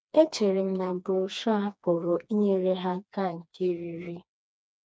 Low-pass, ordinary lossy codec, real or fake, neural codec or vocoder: none; none; fake; codec, 16 kHz, 2 kbps, FreqCodec, smaller model